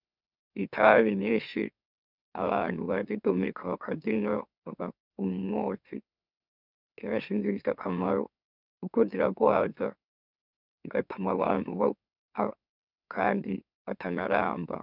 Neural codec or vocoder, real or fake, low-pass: autoencoder, 44.1 kHz, a latent of 192 numbers a frame, MeloTTS; fake; 5.4 kHz